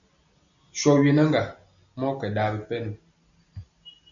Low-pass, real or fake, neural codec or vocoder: 7.2 kHz; real; none